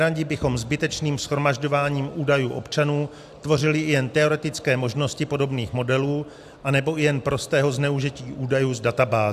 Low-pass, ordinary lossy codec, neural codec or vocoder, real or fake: 14.4 kHz; AAC, 96 kbps; none; real